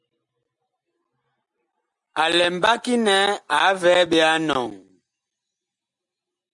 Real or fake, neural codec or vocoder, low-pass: real; none; 10.8 kHz